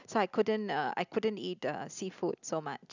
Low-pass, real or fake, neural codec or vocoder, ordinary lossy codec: 7.2 kHz; real; none; none